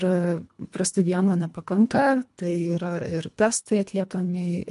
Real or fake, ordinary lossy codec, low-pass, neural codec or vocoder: fake; MP3, 64 kbps; 10.8 kHz; codec, 24 kHz, 1.5 kbps, HILCodec